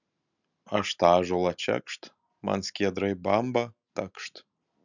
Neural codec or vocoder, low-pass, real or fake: none; 7.2 kHz; real